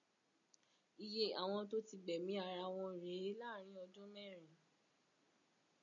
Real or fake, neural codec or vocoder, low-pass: real; none; 7.2 kHz